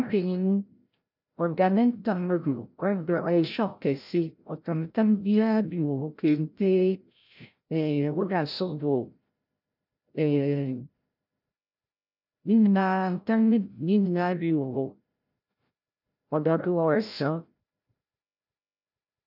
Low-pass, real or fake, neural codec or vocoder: 5.4 kHz; fake; codec, 16 kHz, 0.5 kbps, FreqCodec, larger model